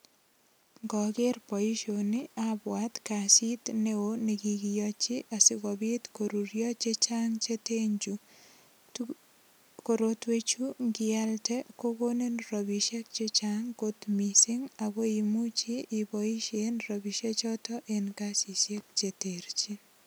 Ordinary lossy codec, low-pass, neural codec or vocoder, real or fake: none; none; none; real